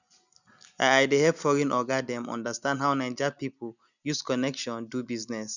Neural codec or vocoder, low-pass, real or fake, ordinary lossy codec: none; 7.2 kHz; real; none